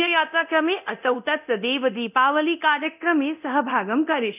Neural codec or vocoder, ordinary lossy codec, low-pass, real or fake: codec, 24 kHz, 0.9 kbps, DualCodec; none; 3.6 kHz; fake